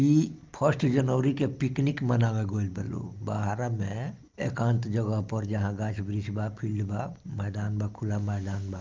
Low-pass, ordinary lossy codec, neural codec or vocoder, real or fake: 7.2 kHz; Opus, 24 kbps; none; real